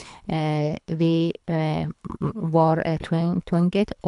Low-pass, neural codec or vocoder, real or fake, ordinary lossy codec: 10.8 kHz; codec, 24 kHz, 3 kbps, HILCodec; fake; none